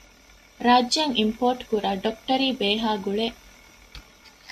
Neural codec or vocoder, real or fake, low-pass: none; real; 14.4 kHz